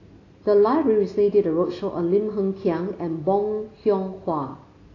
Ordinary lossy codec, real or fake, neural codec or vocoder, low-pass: AAC, 32 kbps; real; none; 7.2 kHz